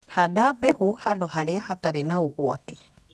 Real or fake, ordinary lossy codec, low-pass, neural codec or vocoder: fake; none; none; codec, 24 kHz, 0.9 kbps, WavTokenizer, medium music audio release